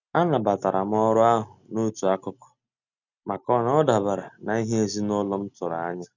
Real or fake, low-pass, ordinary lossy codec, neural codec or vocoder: real; 7.2 kHz; none; none